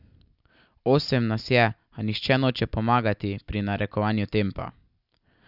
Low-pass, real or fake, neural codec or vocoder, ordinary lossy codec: 5.4 kHz; real; none; none